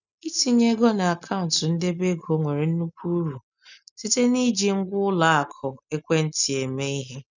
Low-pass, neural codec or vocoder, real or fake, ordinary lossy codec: 7.2 kHz; none; real; none